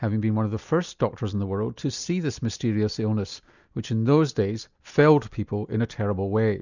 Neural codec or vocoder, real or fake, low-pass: none; real; 7.2 kHz